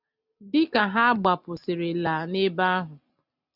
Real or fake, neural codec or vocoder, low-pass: real; none; 5.4 kHz